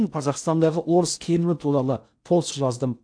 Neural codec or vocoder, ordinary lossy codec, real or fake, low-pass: codec, 16 kHz in and 24 kHz out, 0.6 kbps, FocalCodec, streaming, 4096 codes; AAC, 64 kbps; fake; 9.9 kHz